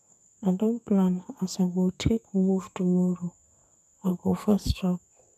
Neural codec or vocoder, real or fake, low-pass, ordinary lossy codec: codec, 44.1 kHz, 2.6 kbps, SNAC; fake; 14.4 kHz; none